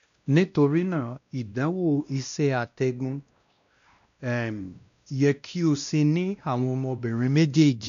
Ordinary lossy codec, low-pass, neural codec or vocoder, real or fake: none; 7.2 kHz; codec, 16 kHz, 1 kbps, X-Codec, WavLM features, trained on Multilingual LibriSpeech; fake